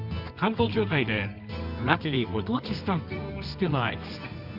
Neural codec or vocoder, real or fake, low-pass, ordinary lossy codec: codec, 24 kHz, 0.9 kbps, WavTokenizer, medium music audio release; fake; 5.4 kHz; Opus, 64 kbps